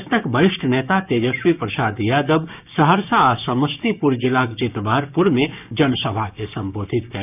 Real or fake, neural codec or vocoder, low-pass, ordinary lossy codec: fake; codec, 16 kHz, 6 kbps, DAC; 3.6 kHz; none